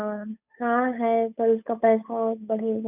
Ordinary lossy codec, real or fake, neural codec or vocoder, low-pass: none; fake; codec, 16 kHz, 8 kbps, FunCodec, trained on Chinese and English, 25 frames a second; 3.6 kHz